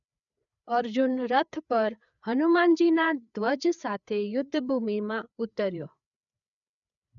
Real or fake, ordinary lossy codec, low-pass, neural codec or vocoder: fake; none; 7.2 kHz; codec, 16 kHz, 4 kbps, FreqCodec, larger model